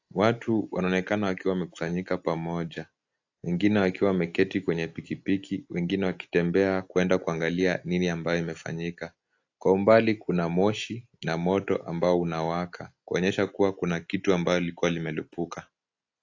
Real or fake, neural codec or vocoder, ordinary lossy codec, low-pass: real; none; AAC, 48 kbps; 7.2 kHz